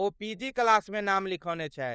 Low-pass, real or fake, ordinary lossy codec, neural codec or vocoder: none; fake; none; codec, 16 kHz, 4 kbps, FunCodec, trained on LibriTTS, 50 frames a second